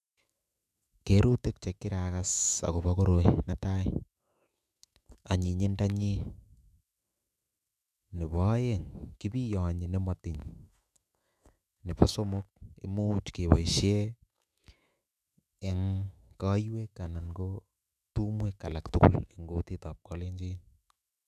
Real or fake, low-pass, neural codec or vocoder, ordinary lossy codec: fake; 14.4 kHz; autoencoder, 48 kHz, 128 numbers a frame, DAC-VAE, trained on Japanese speech; none